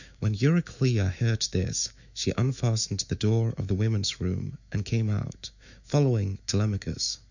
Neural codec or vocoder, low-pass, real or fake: autoencoder, 48 kHz, 128 numbers a frame, DAC-VAE, trained on Japanese speech; 7.2 kHz; fake